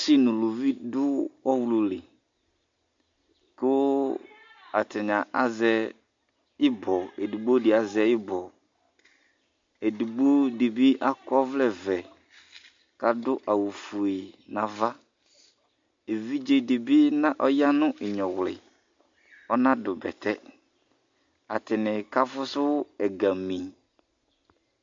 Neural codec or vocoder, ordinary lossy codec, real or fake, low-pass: none; MP3, 48 kbps; real; 7.2 kHz